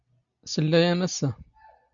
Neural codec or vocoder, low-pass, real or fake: none; 7.2 kHz; real